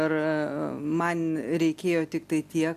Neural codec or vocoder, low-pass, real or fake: none; 14.4 kHz; real